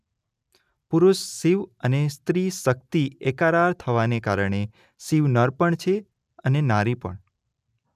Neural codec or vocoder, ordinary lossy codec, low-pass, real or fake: none; none; 14.4 kHz; real